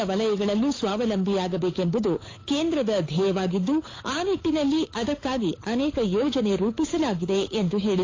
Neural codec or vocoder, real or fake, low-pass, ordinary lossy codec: codec, 16 kHz, 8 kbps, FunCodec, trained on Chinese and English, 25 frames a second; fake; 7.2 kHz; AAC, 32 kbps